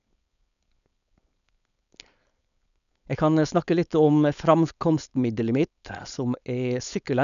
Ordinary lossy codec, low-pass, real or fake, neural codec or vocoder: none; 7.2 kHz; fake; codec, 16 kHz, 4.8 kbps, FACodec